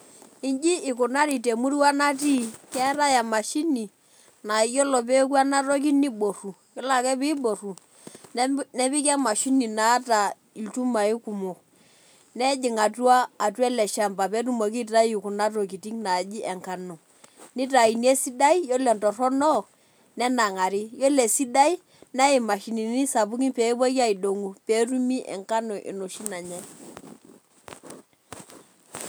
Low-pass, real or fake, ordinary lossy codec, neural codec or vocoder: none; real; none; none